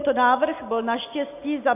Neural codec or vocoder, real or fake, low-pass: none; real; 3.6 kHz